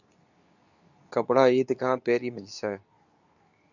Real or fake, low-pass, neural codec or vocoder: fake; 7.2 kHz; codec, 24 kHz, 0.9 kbps, WavTokenizer, medium speech release version 2